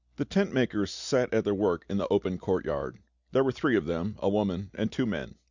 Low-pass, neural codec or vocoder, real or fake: 7.2 kHz; none; real